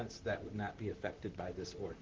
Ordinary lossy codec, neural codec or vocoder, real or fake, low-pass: Opus, 16 kbps; vocoder, 44.1 kHz, 128 mel bands, Pupu-Vocoder; fake; 7.2 kHz